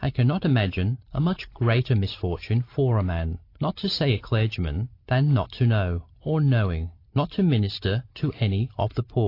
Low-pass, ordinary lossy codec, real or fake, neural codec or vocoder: 5.4 kHz; AAC, 32 kbps; real; none